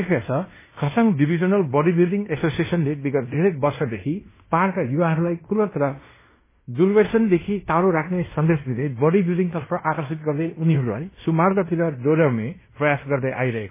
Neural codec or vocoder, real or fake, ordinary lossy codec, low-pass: codec, 16 kHz in and 24 kHz out, 0.9 kbps, LongCat-Audio-Codec, fine tuned four codebook decoder; fake; MP3, 16 kbps; 3.6 kHz